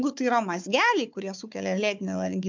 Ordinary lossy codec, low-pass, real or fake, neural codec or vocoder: MP3, 64 kbps; 7.2 kHz; fake; codec, 16 kHz, 4 kbps, FunCodec, trained on Chinese and English, 50 frames a second